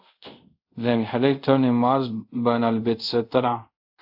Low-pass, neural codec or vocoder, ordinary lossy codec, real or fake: 5.4 kHz; codec, 24 kHz, 0.5 kbps, DualCodec; AAC, 48 kbps; fake